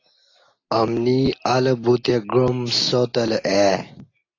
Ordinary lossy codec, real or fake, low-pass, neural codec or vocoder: AAC, 32 kbps; real; 7.2 kHz; none